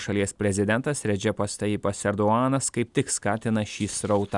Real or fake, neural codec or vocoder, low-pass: real; none; 10.8 kHz